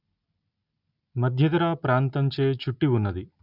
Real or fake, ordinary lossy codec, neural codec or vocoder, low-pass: real; none; none; 5.4 kHz